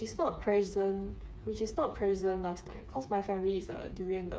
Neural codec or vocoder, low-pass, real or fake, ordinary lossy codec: codec, 16 kHz, 4 kbps, FreqCodec, smaller model; none; fake; none